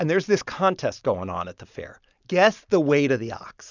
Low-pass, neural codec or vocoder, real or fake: 7.2 kHz; none; real